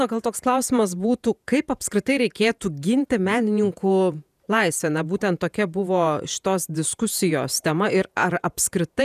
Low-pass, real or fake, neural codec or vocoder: 14.4 kHz; fake; vocoder, 48 kHz, 128 mel bands, Vocos